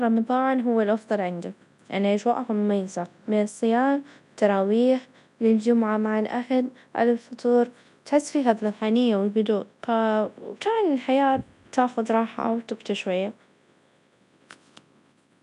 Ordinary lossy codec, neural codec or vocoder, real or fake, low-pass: none; codec, 24 kHz, 0.9 kbps, WavTokenizer, large speech release; fake; 10.8 kHz